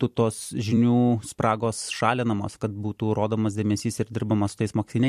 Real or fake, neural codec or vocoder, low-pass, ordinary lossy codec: fake; vocoder, 44.1 kHz, 128 mel bands every 256 samples, BigVGAN v2; 14.4 kHz; MP3, 64 kbps